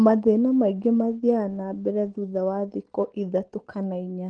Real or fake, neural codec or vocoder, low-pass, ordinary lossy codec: real; none; 9.9 kHz; Opus, 16 kbps